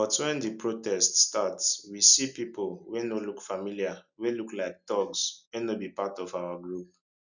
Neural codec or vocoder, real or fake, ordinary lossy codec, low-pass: none; real; none; 7.2 kHz